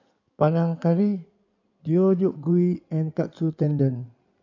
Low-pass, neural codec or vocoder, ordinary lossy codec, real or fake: 7.2 kHz; codec, 16 kHz in and 24 kHz out, 2.2 kbps, FireRedTTS-2 codec; none; fake